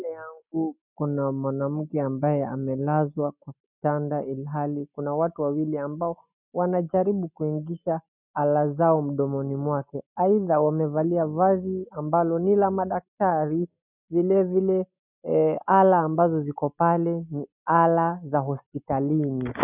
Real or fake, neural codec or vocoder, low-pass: real; none; 3.6 kHz